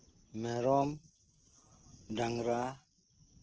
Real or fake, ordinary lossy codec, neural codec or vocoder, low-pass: real; Opus, 16 kbps; none; 7.2 kHz